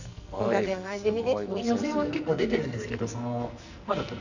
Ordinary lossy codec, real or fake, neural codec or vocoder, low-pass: none; fake; codec, 44.1 kHz, 2.6 kbps, SNAC; 7.2 kHz